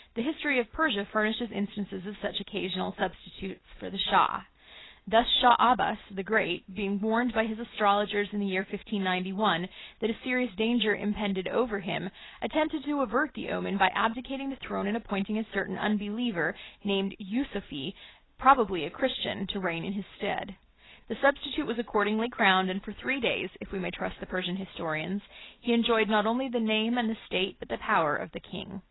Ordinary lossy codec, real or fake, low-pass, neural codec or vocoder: AAC, 16 kbps; real; 7.2 kHz; none